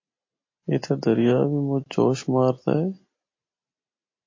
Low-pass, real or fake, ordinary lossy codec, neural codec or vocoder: 7.2 kHz; real; MP3, 32 kbps; none